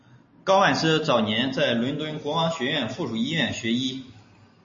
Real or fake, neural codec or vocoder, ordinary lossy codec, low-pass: real; none; MP3, 32 kbps; 7.2 kHz